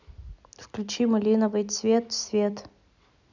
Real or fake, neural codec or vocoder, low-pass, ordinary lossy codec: real; none; 7.2 kHz; none